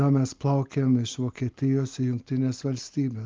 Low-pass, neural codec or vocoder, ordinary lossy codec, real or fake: 7.2 kHz; none; Opus, 24 kbps; real